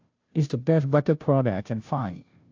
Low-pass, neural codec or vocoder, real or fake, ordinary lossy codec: 7.2 kHz; codec, 16 kHz, 0.5 kbps, FunCodec, trained on Chinese and English, 25 frames a second; fake; AAC, 48 kbps